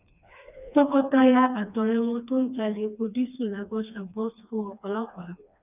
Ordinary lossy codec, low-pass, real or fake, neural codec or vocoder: none; 3.6 kHz; fake; codec, 16 kHz, 2 kbps, FreqCodec, smaller model